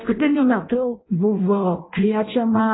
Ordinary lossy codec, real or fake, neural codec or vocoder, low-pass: AAC, 16 kbps; fake; codec, 16 kHz in and 24 kHz out, 0.6 kbps, FireRedTTS-2 codec; 7.2 kHz